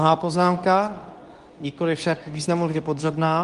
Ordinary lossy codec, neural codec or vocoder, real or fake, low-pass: Opus, 32 kbps; codec, 24 kHz, 0.9 kbps, WavTokenizer, medium speech release version 2; fake; 10.8 kHz